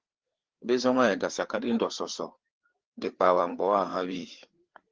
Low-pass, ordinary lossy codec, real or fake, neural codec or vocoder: 7.2 kHz; Opus, 16 kbps; fake; codec, 16 kHz, 4 kbps, FreqCodec, larger model